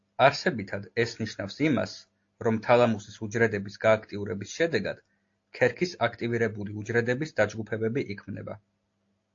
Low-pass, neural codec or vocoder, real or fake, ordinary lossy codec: 7.2 kHz; none; real; AAC, 48 kbps